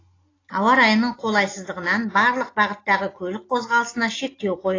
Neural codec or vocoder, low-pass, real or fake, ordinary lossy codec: none; 7.2 kHz; real; AAC, 32 kbps